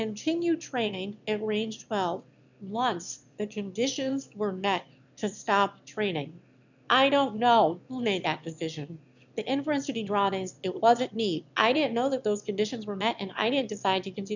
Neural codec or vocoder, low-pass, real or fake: autoencoder, 22.05 kHz, a latent of 192 numbers a frame, VITS, trained on one speaker; 7.2 kHz; fake